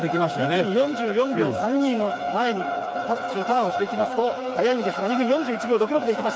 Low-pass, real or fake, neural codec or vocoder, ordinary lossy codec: none; fake; codec, 16 kHz, 4 kbps, FreqCodec, smaller model; none